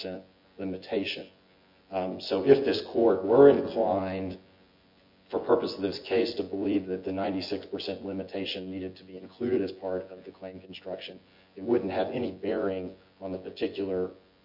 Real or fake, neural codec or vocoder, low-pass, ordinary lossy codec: fake; vocoder, 24 kHz, 100 mel bands, Vocos; 5.4 kHz; MP3, 32 kbps